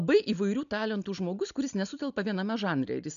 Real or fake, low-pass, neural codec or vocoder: real; 7.2 kHz; none